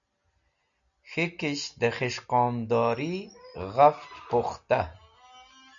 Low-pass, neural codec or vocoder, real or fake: 7.2 kHz; none; real